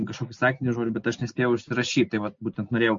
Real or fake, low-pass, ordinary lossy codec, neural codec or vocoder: real; 7.2 kHz; MP3, 48 kbps; none